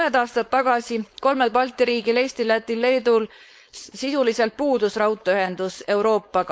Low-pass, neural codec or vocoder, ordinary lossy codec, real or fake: none; codec, 16 kHz, 4.8 kbps, FACodec; none; fake